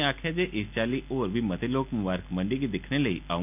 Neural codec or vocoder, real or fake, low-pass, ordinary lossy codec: none; real; 3.6 kHz; none